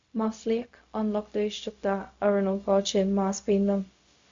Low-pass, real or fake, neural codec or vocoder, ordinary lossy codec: 7.2 kHz; fake; codec, 16 kHz, 0.4 kbps, LongCat-Audio-Codec; Opus, 64 kbps